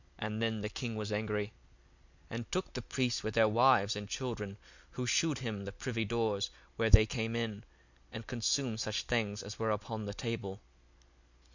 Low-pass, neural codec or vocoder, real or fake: 7.2 kHz; none; real